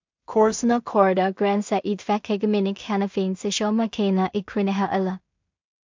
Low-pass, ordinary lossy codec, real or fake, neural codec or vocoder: 7.2 kHz; MP3, 64 kbps; fake; codec, 16 kHz in and 24 kHz out, 0.4 kbps, LongCat-Audio-Codec, two codebook decoder